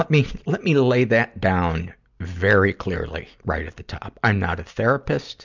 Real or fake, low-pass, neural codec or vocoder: real; 7.2 kHz; none